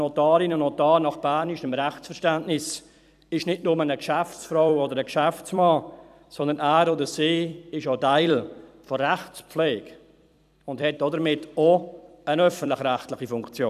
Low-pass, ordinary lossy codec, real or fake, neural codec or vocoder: 14.4 kHz; none; fake; vocoder, 44.1 kHz, 128 mel bands every 256 samples, BigVGAN v2